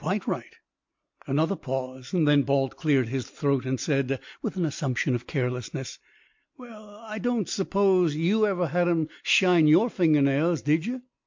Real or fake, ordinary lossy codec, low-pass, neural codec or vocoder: real; MP3, 48 kbps; 7.2 kHz; none